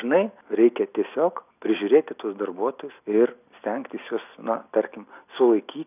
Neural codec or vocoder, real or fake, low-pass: none; real; 3.6 kHz